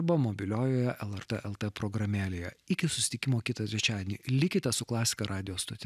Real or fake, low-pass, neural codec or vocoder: real; 14.4 kHz; none